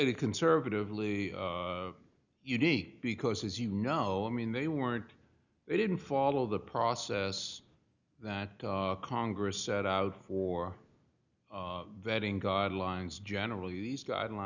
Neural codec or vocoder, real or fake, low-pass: none; real; 7.2 kHz